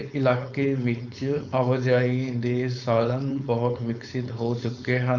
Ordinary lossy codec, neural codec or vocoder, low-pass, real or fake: none; codec, 16 kHz, 4.8 kbps, FACodec; 7.2 kHz; fake